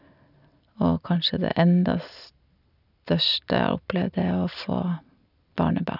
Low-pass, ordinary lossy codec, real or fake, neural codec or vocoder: 5.4 kHz; none; real; none